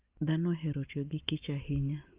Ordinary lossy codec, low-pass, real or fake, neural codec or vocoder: Opus, 24 kbps; 3.6 kHz; real; none